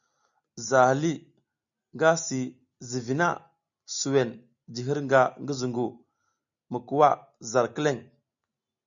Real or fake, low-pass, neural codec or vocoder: real; 7.2 kHz; none